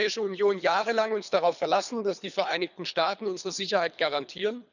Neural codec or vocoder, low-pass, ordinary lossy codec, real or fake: codec, 24 kHz, 3 kbps, HILCodec; 7.2 kHz; none; fake